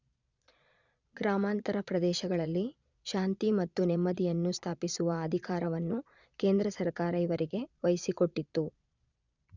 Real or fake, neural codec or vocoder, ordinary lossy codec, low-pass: fake; vocoder, 22.05 kHz, 80 mel bands, Vocos; none; 7.2 kHz